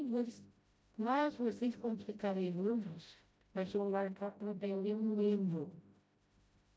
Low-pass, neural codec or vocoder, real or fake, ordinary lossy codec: none; codec, 16 kHz, 0.5 kbps, FreqCodec, smaller model; fake; none